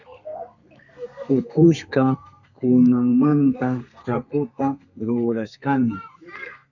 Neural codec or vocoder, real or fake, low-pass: codec, 32 kHz, 1.9 kbps, SNAC; fake; 7.2 kHz